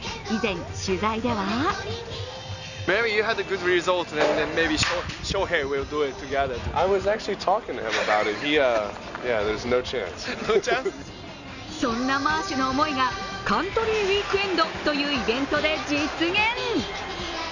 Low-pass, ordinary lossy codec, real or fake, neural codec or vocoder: 7.2 kHz; none; real; none